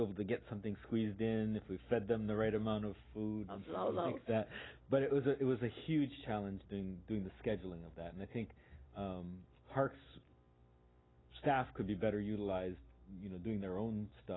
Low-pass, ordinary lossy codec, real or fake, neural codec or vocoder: 7.2 kHz; AAC, 16 kbps; real; none